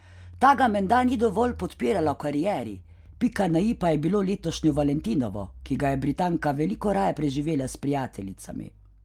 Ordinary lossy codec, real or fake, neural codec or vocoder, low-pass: Opus, 32 kbps; real; none; 19.8 kHz